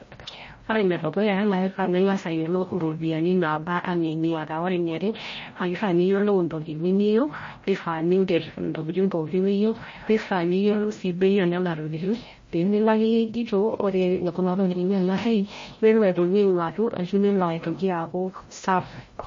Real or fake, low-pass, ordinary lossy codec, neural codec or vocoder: fake; 7.2 kHz; MP3, 32 kbps; codec, 16 kHz, 0.5 kbps, FreqCodec, larger model